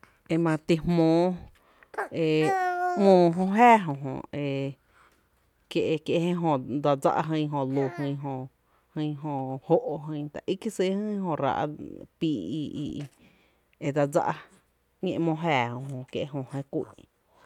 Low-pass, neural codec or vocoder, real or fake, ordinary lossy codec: 19.8 kHz; none; real; none